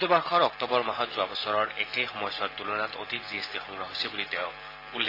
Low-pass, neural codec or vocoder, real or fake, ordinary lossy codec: 5.4 kHz; none; real; AAC, 24 kbps